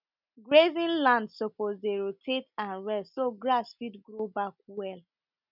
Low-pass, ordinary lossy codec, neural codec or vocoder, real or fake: 5.4 kHz; none; none; real